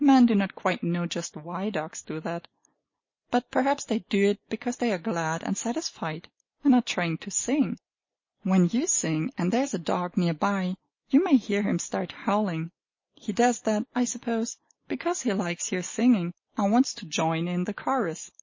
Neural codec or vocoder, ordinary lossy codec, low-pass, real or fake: none; MP3, 32 kbps; 7.2 kHz; real